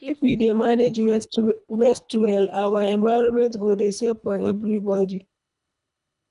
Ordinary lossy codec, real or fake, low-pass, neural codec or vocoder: none; fake; 10.8 kHz; codec, 24 kHz, 1.5 kbps, HILCodec